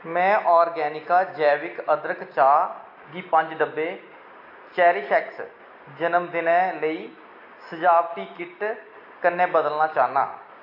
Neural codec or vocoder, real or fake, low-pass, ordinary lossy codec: none; real; 5.4 kHz; AAC, 32 kbps